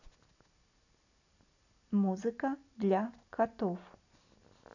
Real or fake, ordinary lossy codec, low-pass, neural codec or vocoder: real; none; 7.2 kHz; none